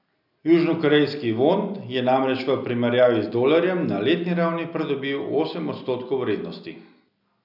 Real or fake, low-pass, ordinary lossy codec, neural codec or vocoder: real; 5.4 kHz; none; none